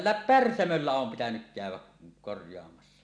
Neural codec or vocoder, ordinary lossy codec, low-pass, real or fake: none; none; 9.9 kHz; real